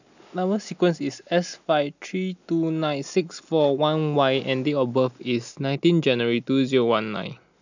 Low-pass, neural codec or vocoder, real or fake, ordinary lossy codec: 7.2 kHz; none; real; none